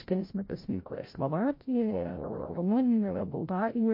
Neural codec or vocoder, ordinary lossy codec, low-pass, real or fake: codec, 16 kHz, 0.5 kbps, FreqCodec, larger model; MP3, 32 kbps; 5.4 kHz; fake